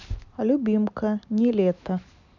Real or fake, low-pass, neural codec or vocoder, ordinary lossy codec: real; 7.2 kHz; none; none